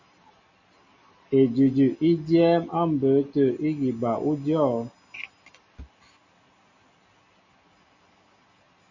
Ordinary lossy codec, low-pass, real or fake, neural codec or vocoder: AAC, 48 kbps; 7.2 kHz; real; none